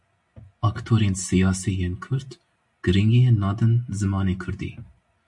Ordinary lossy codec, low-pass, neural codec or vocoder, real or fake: MP3, 64 kbps; 10.8 kHz; none; real